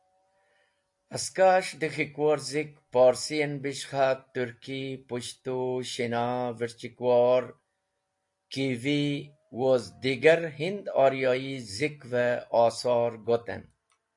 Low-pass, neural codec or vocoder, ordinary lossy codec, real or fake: 10.8 kHz; none; AAC, 64 kbps; real